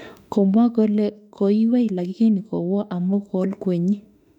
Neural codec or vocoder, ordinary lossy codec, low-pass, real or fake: autoencoder, 48 kHz, 32 numbers a frame, DAC-VAE, trained on Japanese speech; none; 19.8 kHz; fake